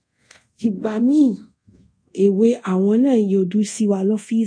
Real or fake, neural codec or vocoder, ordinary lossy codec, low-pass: fake; codec, 24 kHz, 0.5 kbps, DualCodec; MP3, 48 kbps; 9.9 kHz